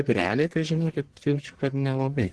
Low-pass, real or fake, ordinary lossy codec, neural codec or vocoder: 10.8 kHz; fake; Opus, 16 kbps; codec, 44.1 kHz, 1.7 kbps, Pupu-Codec